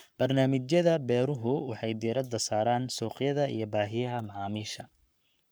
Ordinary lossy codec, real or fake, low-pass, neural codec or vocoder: none; fake; none; codec, 44.1 kHz, 7.8 kbps, Pupu-Codec